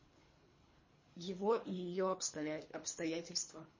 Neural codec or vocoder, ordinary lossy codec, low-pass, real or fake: codec, 24 kHz, 3 kbps, HILCodec; MP3, 32 kbps; 7.2 kHz; fake